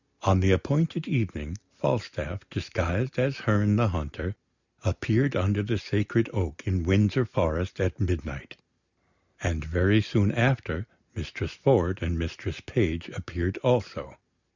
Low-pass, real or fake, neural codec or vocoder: 7.2 kHz; real; none